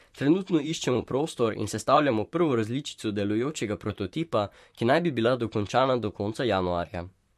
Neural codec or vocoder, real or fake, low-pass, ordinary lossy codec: vocoder, 44.1 kHz, 128 mel bands, Pupu-Vocoder; fake; 14.4 kHz; MP3, 64 kbps